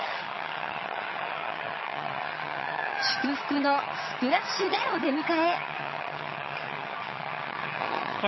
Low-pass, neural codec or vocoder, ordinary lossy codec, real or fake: 7.2 kHz; vocoder, 22.05 kHz, 80 mel bands, HiFi-GAN; MP3, 24 kbps; fake